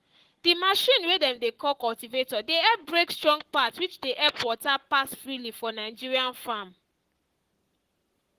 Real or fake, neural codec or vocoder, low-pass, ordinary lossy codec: fake; codec, 44.1 kHz, 7.8 kbps, Pupu-Codec; 14.4 kHz; Opus, 16 kbps